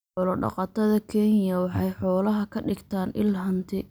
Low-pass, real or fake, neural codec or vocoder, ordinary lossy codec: none; real; none; none